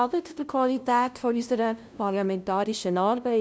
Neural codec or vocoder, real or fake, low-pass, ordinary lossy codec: codec, 16 kHz, 0.5 kbps, FunCodec, trained on LibriTTS, 25 frames a second; fake; none; none